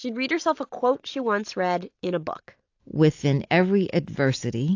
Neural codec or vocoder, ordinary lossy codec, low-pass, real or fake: none; AAC, 48 kbps; 7.2 kHz; real